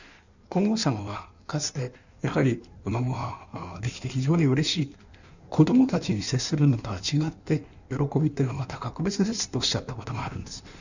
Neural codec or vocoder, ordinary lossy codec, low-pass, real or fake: codec, 16 kHz in and 24 kHz out, 1.1 kbps, FireRedTTS-2 codec; none; 7.2 kHz; fake